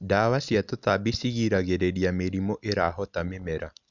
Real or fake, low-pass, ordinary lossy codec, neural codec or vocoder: real; 7.2 kHz; none; none